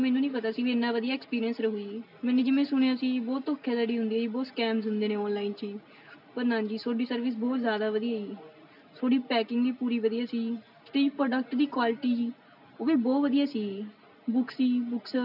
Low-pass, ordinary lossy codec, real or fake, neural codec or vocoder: 5.4 kHz; none; real; none